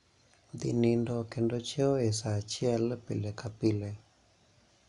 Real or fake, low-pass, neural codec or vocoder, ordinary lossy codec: real; 10.8 kHz; none; none